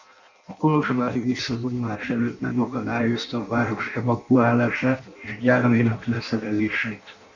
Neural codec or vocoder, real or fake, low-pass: codec, 16 kHz in and 24 kHz out, 0.6 kbps, FireRedTTS-2 codec; fake; 7.2 kHz